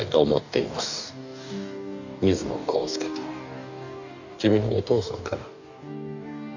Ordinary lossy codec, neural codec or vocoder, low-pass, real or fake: none; codec, 44.1 kHz, 2.6 kbps, DAC; 7.2 kHz; fake